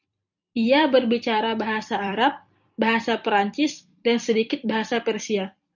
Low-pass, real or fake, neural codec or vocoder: 7.2 kHz; fake; vocoder, 44.1 kHz, 128 mel bands every 256 samples, BigVGAN v2